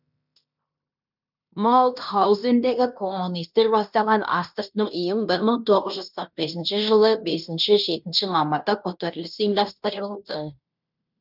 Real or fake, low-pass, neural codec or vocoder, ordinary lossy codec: fake; 5.4 kHz; codec, 16 kHz in and 24 kHz out, 0.9 kbps, LongCat-Audio-Codec, fine tuned four codebook decoder; none